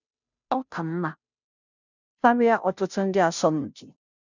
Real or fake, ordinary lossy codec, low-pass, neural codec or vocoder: fake; none; 7.2 kHz; codec, 16 kHz, 0.5 kbps, FunCodec, trained on Chinese and English, 25 frames a second